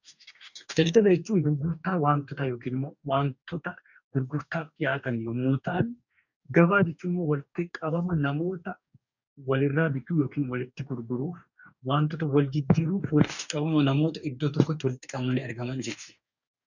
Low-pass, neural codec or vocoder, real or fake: 7.2 kHz; codec, 44.1 kHz, 2.6 kbps, DAC; fake